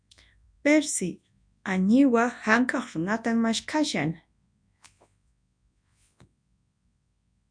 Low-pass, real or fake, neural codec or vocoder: 9.9 kHz; fake; codec, 24 kHz, 0.9 kbps, WavTokenizer, large speech release